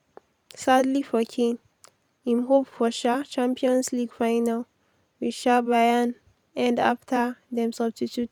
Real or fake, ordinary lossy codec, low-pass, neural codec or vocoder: fake; none; 19.8 kHz; vocoder, 44.1 kHz, 128 mel bands every 512 samples, BigVGAN v2